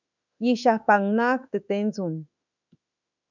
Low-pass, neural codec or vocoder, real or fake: 7.2 kHz; autoencoder, 48 kHz, 32 numbers a frame, DAC-VAE, trained on Japanese speech; fake